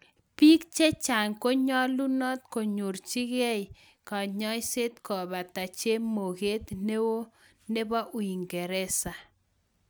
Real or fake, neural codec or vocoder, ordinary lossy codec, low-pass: real; none; none; none